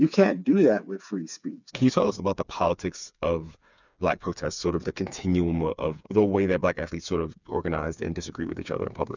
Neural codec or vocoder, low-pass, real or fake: codec, 16 kHz, 4 kbps, FreqCodec, smaller model; 7.2 kHz; fake